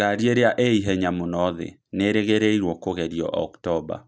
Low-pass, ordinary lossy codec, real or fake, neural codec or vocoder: none; none; real; none